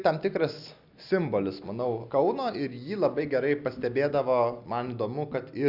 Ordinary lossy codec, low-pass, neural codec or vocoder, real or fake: Opus, 64 kbps; 5.4 kHz; none; real